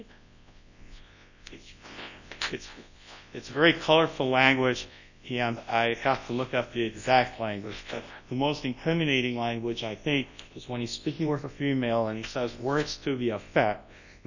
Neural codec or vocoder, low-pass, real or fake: codec, 24 kHz, 0.9 kbps, WavTokenizer, large speech release; 7.2 kHz; fake